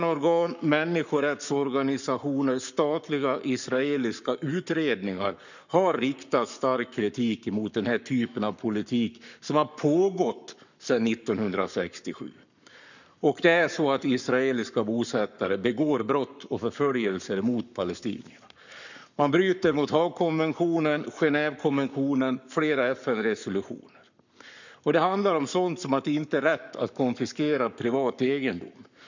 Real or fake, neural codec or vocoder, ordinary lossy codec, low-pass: fake; codec, 44.1 kHz, 7.8 kbps, Pupu-Codec; none; 7.2 kHz